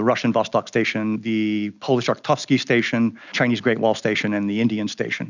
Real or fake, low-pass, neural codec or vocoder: real; 7.2 kHz; none